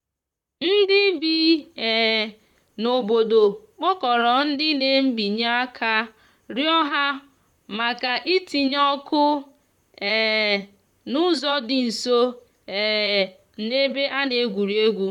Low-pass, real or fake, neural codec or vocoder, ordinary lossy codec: 19.8 kHz; fake; vocoder, 44.1 kHz, 128 mel bands, Pupu-Vocoder; none